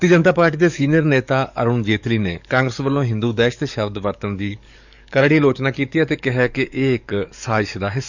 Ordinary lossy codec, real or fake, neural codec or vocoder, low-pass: none; fake; codec, 44.1 kHz, 7.8 kbps, DAC; 7.2 kHz